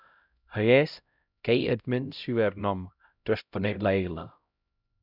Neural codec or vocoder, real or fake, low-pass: codec, 16 kHz, 0.5 kbps, X-Codec, HuBERT features, trained on LibriSpeech; fake; 5.4 kHz